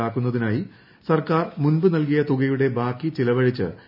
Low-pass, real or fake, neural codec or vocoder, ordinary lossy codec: 5.4 kHz; real; none; none